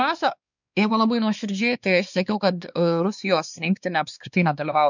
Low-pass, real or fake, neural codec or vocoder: 7.2 kHz; fake; codec, 16 kHz, 2 kbps, X-Codec, WavLM features, trained on Multilingual LibriSpeech